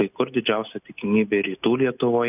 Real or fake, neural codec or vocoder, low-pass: real; none; 3.6 kHz